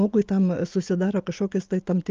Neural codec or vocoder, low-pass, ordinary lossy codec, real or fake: none; 7.2 kHz; Opus, 32 kbps; real